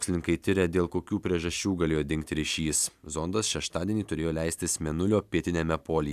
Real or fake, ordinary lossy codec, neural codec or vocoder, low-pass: real; AAC, 96 kbps; none; 14.4 kHz